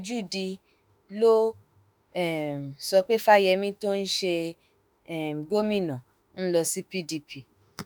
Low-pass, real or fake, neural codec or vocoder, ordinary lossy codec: none; fake; autoencoder, 48 kHz, 32 numbers a frame, DAC-VAE, trained on Japanese speech; none